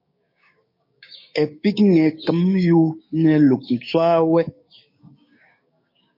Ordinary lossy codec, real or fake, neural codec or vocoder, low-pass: MP3, 32 kbps; fake; codec, 16 kHz, 6 kbps, DAC; 5.4 kHz